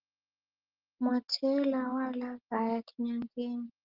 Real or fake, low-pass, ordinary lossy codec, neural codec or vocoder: real; 5.4 kHz; Opus, 16 kbps; none